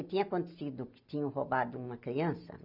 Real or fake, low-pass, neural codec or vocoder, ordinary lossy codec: real; 5.4 kHz; none; none